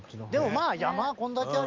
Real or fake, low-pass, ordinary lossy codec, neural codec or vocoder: real; 7.2 kHz; Opus, 32 kbps; none